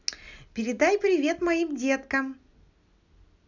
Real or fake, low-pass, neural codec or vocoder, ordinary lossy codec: real; 7.2 kHz; none; none